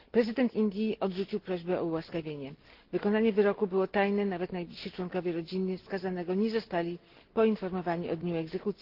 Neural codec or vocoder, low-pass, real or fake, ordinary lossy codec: none; 5.4 kHz; real; Opus, 16 kbps